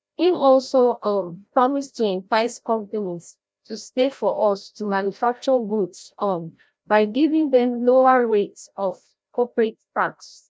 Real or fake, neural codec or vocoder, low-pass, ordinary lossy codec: fake; codec, 16 kHz, 0.5 kbps, FreqCodec, larger model; none; none